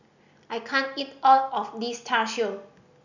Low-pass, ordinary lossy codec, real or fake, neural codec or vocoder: 7.2 kHz; none; real; none